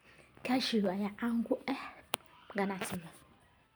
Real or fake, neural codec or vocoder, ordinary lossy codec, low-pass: real; none; none; none